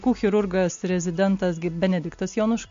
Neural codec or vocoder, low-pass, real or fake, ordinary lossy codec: none; 7.2 kHz; real; MP3, 48 kbps